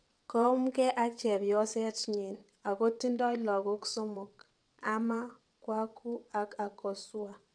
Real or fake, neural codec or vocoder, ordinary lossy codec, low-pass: fake; vocoder, 22.05 kHz, 80 mel bands, WaveNeXt; none; 9.9 kHz